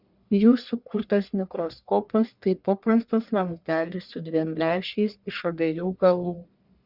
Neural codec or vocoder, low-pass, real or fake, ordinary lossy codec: codec, 44.1 kHz, 1.7 kbps, Pupu-Codec; 5.4 kHz; fake; Opus, 64 kbps